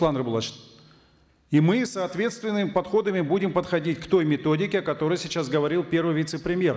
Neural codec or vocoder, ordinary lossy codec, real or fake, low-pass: none; none; real; none